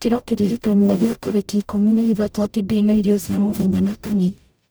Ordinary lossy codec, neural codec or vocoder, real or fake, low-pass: none; codec, 44.1 kHz, 0.9 kbps, DAC; fake; none